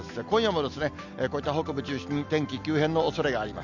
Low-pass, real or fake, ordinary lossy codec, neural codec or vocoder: 7.2 kHz; real; none; none